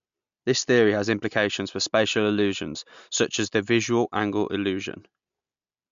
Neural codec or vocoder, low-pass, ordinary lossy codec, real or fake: none; 7.2 kHz; MP3, 64 kbps; real